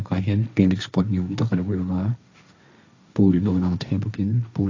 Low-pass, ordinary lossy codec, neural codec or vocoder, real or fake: 7.2 kHz; AAC, 48 kbps; codec, 16 kHz, 1.1 kbps, Voila-Tokenizer; fake